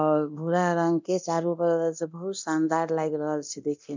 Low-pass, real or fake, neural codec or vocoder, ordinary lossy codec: 7.2 kHz; fake; codec, 24 kHz, 0.9 kbps, DualCodec; MP3, 64 kbps